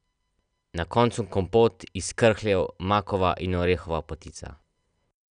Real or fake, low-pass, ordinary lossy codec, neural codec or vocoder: real; 9.9 kHz; none; none